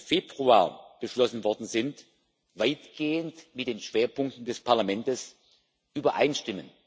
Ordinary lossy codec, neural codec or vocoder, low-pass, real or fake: none; none; none; real